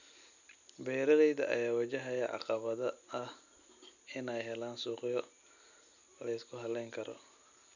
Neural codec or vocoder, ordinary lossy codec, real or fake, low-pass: none; none; real; 7.2 kHz